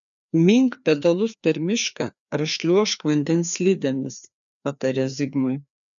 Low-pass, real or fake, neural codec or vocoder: 7.2 kHz; fake; codec, 16 kHz, 2 kbps, FreqCodec, larger model